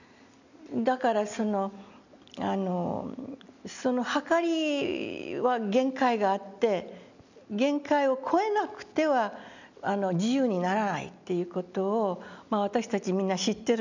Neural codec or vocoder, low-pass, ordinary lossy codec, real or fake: none; 7.2 kHz; none; real